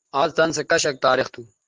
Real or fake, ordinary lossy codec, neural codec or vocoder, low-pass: real; Opus, 24 kbps; none; 7.2 kHz